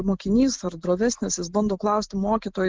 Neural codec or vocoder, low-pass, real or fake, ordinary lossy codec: none; 7.2 kHz; real; Opus, 16 kbps